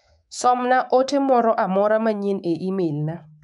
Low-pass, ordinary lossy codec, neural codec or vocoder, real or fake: 10.8 kHz; MP3, 96 kbps; codec, 24 kHz, 3.1 kbps, DualCodec; fake